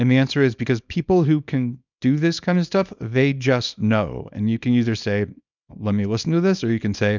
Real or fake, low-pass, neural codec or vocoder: fake; 7.2 kHz; codec, 24 kHz, 0.9 kbps, WavTokenizer, small release